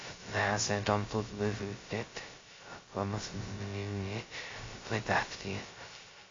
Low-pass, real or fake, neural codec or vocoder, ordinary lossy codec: 7.2 kHz; fake; codec, 16 kHz, 0.2 kbps, FocalCodec; AAC, 32 kbps